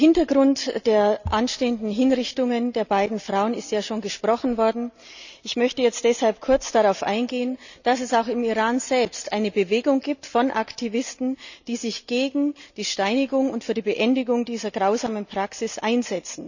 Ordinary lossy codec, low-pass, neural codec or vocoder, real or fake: none; 7.2 kHz; none; real